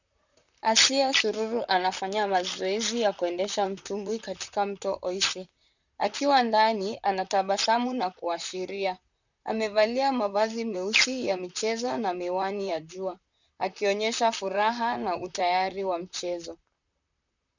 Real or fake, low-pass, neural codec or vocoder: fake; 7.2 kHz; vocoder, 44.1 kHz, 128 mel bands, Pupu-Vocoder